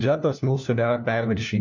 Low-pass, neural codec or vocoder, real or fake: 7.2 kHz; codec, 16 kHz, 1 kbps, FunCodec, trained on LibriTTS, 50 frames a second; fake